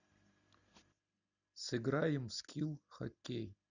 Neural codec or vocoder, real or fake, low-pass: none; real; 7.2 kHz